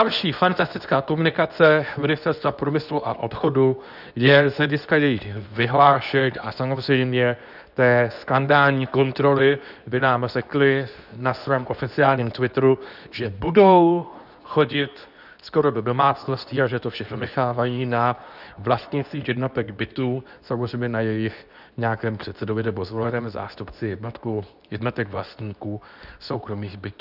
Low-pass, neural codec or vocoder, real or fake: 5.4 kHz; codec, 24 kHz, 0.9 kbps, WavTokenizer, medium speech release version 2; fake